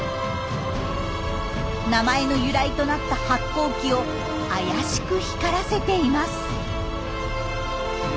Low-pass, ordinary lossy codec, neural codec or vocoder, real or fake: none; none; none; real